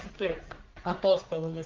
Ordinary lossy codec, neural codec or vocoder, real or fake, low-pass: Opus, 32 kbps; codec, 44.1 kHz, 1.7 kbps, Pupu-Codec; fake; 7.2 kHz